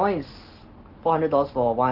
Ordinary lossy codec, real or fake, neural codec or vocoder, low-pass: Opus, 16 kbps; real; none; 5.4 kHz